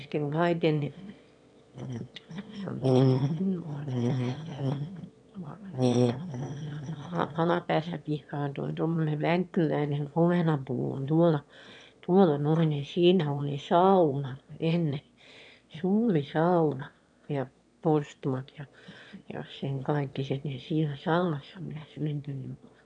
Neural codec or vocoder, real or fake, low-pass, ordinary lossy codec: autoencoder, 22.05 kHz, a latent of 192 numbers a frame, VITS, trained on one speaker; fake; 9.9 kHz; none